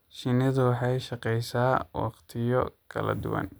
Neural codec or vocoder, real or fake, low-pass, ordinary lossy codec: none; real; none; none